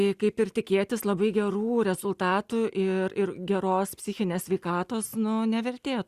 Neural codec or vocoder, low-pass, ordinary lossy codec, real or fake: none; 14.4 kHz; Opus, 64 kbps; real